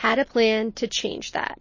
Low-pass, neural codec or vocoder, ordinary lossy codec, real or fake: 7.2 kHz; none; MP3, 32 kbps; real